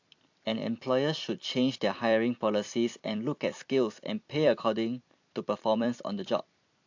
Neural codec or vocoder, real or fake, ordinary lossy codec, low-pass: vocoder, 44.1 kHz, 128 mel bands every 256 samples, BigVGAN v2; fake; AAC, 48 kbps; 7.2 kHz